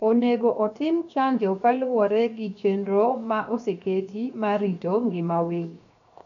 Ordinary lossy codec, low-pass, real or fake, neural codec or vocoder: none; 7.2 kHz; fake; codec, 16 kHz, 0.7 kbps, FocalCodec